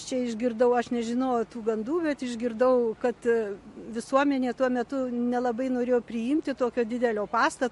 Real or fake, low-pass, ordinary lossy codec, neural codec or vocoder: real; 14.4 kHz; MP3, 48 kbps; none